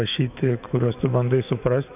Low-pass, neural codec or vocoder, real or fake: 3.6 kHz; autoencoder, 48 kHz, 128 numbers a frame, DAC-VAE, trained on Japanese speech; fake